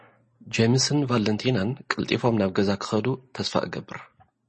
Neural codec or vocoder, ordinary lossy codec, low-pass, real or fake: none; MP3, 32 kbps; 10.8 kHz; real